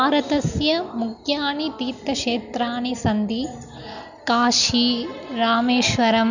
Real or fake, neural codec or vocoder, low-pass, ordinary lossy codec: real; none; 7.2 kHz; none